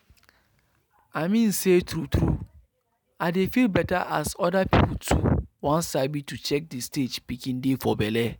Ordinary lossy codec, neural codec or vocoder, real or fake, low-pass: none; none; real; none